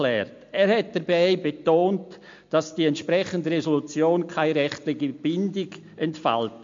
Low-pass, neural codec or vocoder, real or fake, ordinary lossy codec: 7.2 kHz; none; real; MP3, 48 kbps